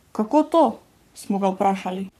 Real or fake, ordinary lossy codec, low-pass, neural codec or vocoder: fake; none; 14.4 kHz; codec, 44.1 kHz, 3.4 kbps, Pupu-Codec